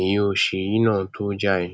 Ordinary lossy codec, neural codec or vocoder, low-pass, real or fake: none; none; none; real